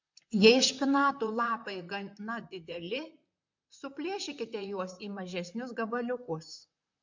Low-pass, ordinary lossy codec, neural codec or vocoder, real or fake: 7.2 kHz; MP3, 48 kbps; vocoder, 44.1 kHz, 128 mel bands, Pupu-Vocoder; fake